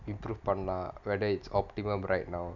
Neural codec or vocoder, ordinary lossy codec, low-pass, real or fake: none; none; 7.2 kHz; real